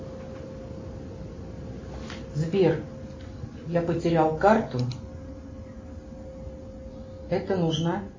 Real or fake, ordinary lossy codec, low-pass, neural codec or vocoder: real; MP3, 32 kbps; 7.2 kHz; none